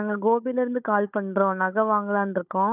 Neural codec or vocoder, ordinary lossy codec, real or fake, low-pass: codec, 16 kHz, 16 kbps, FunCodec, trained on Chinese and English, 50 frames a second; none; fake; 3.6 kHz